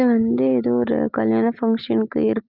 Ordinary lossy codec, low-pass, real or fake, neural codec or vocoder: Opus, 24 kbps; 5.4 kHz; real; none